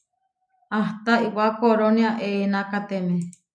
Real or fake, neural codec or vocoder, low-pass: real; none; 9.9 kHz